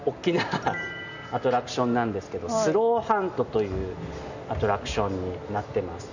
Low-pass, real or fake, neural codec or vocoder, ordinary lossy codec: 7.2 kHz; real; none; none